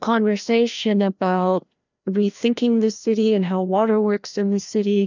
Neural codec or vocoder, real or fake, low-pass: codec, 16 kHz, 1 kbps, FreqCodec, larger model; fake; 7.2 kHz